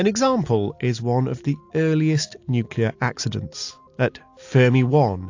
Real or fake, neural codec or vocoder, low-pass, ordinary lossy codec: real; none; 7.2 kHz; AAC, 48 kbps